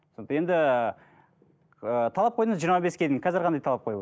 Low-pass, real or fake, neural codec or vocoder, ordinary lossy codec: none; real; none; none